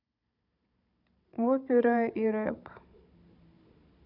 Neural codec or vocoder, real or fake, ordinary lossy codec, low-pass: codec, 16 kHz, 16 kbps, FunCodec, trained on Chinese and English, 50 frames a second; fake; none; 5.4 kHz